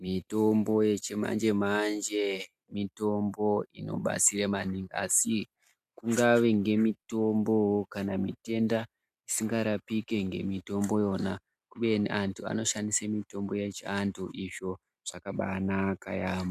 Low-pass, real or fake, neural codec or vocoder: 14.4 kHz; real; none